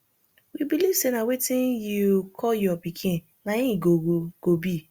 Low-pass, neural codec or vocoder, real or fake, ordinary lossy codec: 19.8 kHz; none; real; Opus, 64 kbps